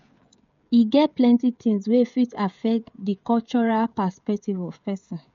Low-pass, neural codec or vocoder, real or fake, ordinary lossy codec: 7.2 kHz; codec, 16 kHz, 16 kbps, FreqCodec, smaller model; fake; MP3, 48 kbps